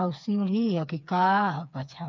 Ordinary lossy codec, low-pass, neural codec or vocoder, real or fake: none; 7.2 kHz; codec, 16 kHz, 4 kbps, FreqCodec, smaller model; fake